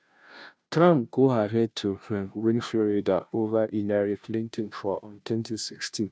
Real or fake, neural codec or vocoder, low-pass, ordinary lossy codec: fake; codec, 16 kHz, 0.5 kbps, FunCodec, trained on Chinese and English, 25 frames a second; none; none